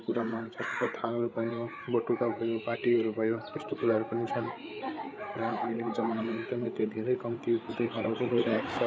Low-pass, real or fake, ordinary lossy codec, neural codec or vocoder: none; fake; none; codec, 16 kHz, 8 kbps, FreqCodec, larger model